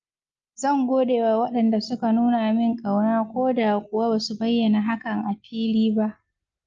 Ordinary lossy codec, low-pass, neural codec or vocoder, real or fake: Opus, 24 kbps; 7.2 kHz; none; real